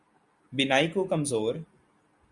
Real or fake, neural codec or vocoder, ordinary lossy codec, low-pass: real; none; Opus, 64 kbps; 10.8 kHz